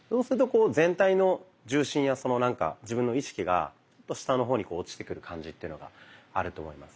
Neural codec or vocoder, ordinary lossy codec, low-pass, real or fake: none; none; none; real